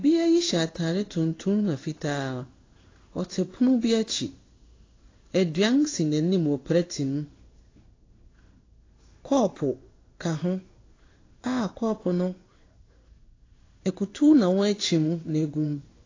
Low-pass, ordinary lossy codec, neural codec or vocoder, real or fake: 7.2 kHz; AAC, 32 kbps; codec, 16 kHz in and 24 kHz out, 1 kbps, XY-Tokenizer; fake